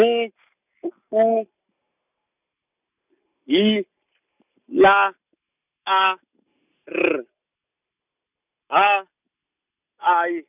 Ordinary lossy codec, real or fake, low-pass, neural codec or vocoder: none; real; 3.6 kHz; none